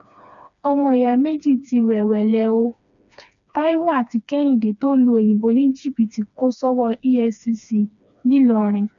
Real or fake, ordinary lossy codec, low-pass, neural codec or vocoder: fake; none; 7.2 kHz; codec, 16 kHz, 2 kbps, FreqCodec, smaller model